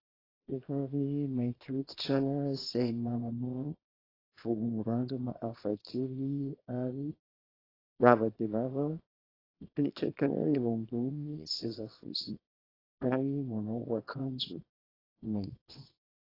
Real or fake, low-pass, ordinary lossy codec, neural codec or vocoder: fake; 5.4 kHz; AAC, 24 kbps; codec, 24 kHz, 0.9 kbps, WavTokenizer, small release